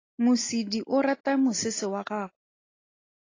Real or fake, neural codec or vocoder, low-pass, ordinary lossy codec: real; none; 7.2 kHz; AAC, 32 kbps